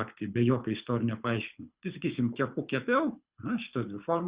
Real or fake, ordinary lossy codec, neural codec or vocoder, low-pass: fake; Opus, 64 kbps; codec, 24 kHz, 6 kbps, HILCodec; 3.6 kHz